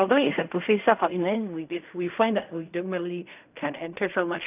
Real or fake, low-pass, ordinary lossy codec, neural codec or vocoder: fake; 3.6 kHz; none; codec, 16 kHz in and 24 kHz out, 0.4 kbps, LongCat-Audio-Codec, fine tuned four codebook decoder